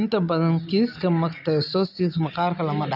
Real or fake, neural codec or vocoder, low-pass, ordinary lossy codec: real; none; 5.4 kHz; none